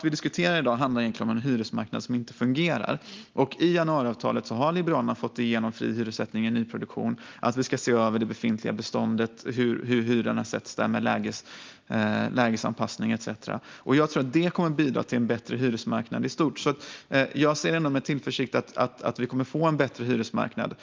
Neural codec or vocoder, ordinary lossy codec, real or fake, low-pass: none; Opus, 32 kbps; real; 7.2 kHz